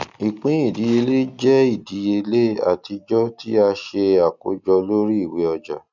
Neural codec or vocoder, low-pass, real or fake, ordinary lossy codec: none; 7.2 kHz; real; none